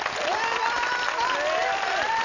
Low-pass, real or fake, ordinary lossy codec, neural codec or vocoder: 7.2 kHz; real; none; none